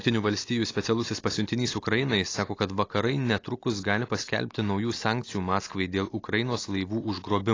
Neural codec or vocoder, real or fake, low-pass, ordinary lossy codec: none; real; 7.2 kHz; AAC, 32 kbps